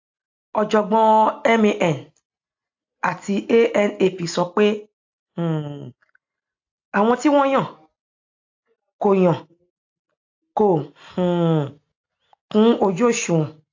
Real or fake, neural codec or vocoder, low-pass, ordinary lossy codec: real; none; 7.2 kHz; AAC, 48 kbps